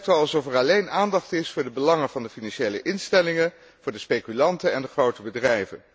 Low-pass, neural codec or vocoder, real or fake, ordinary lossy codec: none; none; real; none